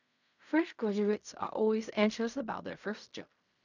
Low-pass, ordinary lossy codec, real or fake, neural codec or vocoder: 7.2 kHz; none; fake; codec, 16 kHz in and 24 kHz out, 0.4 kbps, LongCat-Audio-Codec, fine tuned four codebook decoder